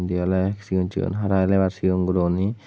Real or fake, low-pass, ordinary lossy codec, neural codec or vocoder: real; none; none; none